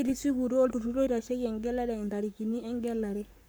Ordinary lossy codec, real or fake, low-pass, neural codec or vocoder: none; fake; none; codec, 44.1 kHz, 7.8 kbps, Pupu-Codec